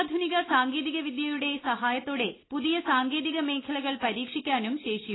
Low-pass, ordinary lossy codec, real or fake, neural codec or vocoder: 7.2 kHz; AAC, 16 kbps; real; none